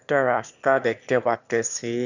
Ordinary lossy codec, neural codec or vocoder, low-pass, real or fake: Opus, 64 kbps; autoencoder, 22.05 kHz, a latent of 192 numbers a frame, VITS, trained on one speaker; 7.2 kHz; fake